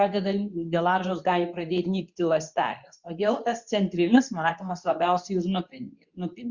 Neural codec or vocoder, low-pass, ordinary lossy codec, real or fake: codec, 24 kHz, 0.9 kbps, WavTokenizer, medium speech release version 2; 7.2 kHz; Opus, 64 kbps; fake